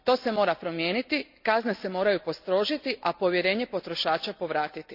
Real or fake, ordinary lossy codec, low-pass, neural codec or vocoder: real; none; 5.4 kHz; none